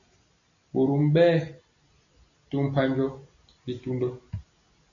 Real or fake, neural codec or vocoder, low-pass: real; none; 7.2 kHz